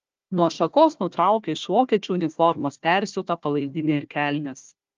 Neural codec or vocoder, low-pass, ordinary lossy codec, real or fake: codec, 16 kHz, 1 kbps, FunCodec, trained on Chinese and English, 50 frames a second; 7.2 kHz; Opus, 32 kbps; fake